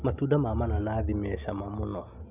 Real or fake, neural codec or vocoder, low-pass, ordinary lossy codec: real; none; 3.6 kHz; none